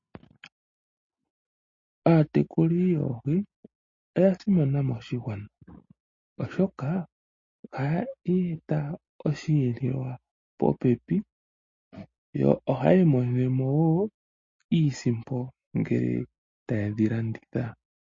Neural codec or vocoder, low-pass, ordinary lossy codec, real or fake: none; 7.2 kHz; MP3, 32 kbps; real